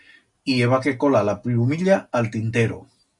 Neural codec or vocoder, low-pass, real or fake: none; 10.8 kHz; real